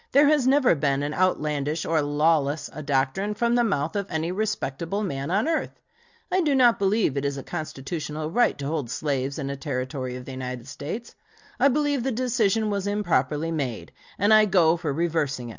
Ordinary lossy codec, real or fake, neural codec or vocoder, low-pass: Opus, 64 kbps; real; none; 7.2 kHz